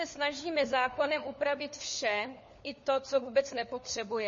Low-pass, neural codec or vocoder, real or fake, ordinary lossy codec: 7.2 kHz; codec, 16 kHz, 4 kbps, FunCodec, trained on LibriTTS, 50 frames a second; fake; MP3, 32 kbps